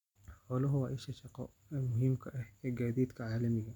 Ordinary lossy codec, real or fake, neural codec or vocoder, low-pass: none; real; none; 19.8 kHz